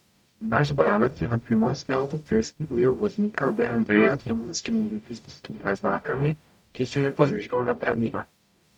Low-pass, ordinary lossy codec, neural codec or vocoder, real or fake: 19.8 kHz; none; codec, 44.1 kHz, 0.9 kbps, DAC; fake